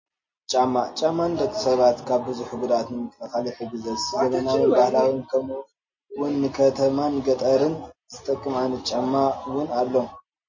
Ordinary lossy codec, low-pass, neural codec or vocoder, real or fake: MP3, 32 kbps; 7.2 kHz; none; real